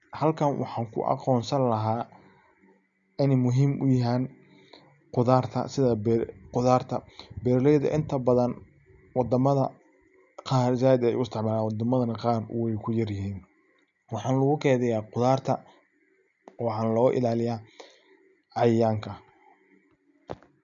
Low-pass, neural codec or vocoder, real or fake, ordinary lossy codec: 7.2 kHz; none; real; none